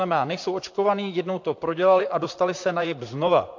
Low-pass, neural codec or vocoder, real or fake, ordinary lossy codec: 7.2 kHz; vocoder, 44.1 kHz, 128 mel bands, Pupu-Vocoder; fake; AAC, 48 kbps